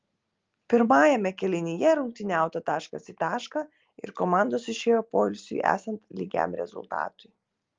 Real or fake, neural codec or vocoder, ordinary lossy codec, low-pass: real; none; Opus, 32 kbps; 7.2 kHz